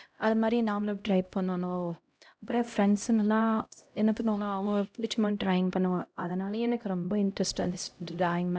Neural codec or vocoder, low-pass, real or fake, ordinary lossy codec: codec, 16 kHz, 0.5 kbps, X-Codec, HuBERT features, trained on LibriSpeech; none; fake; none